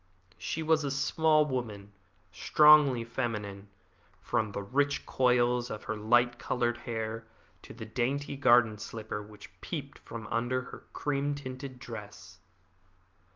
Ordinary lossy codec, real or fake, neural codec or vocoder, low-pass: Opus, 32 kbps; real; none; 7.2 kHz